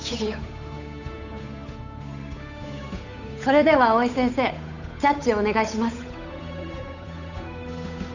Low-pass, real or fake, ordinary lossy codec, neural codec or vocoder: 7.2 kHz; fake; none; codec, 16 kHz, 8 kbps, FunCodec, trained on Chinese and English, 25 frames a second